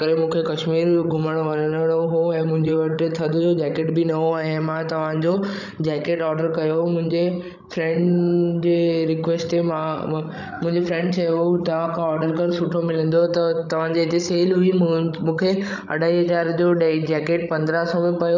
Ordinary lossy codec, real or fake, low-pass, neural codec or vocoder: none; fake; 7.2 kHz; codec, 16 kHz, 16 kbps, FreqCodec, larger model